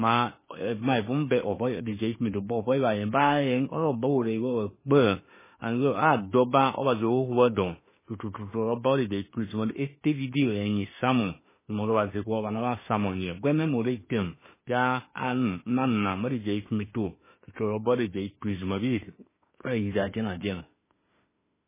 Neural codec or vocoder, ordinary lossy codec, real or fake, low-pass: autoencoder, 48 kHz, 32 numbers a frame, DAC-VAE, trained on Japanese speech; MP3, 16 kbps; fake; 3.6 kHz